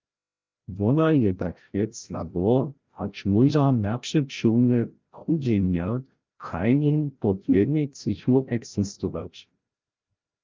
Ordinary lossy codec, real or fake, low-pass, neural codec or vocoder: Opus, 32 kbps; fake; 7.2 kHz; codec, 16 kHz, 0.5 kbps, FreqCodec, larger model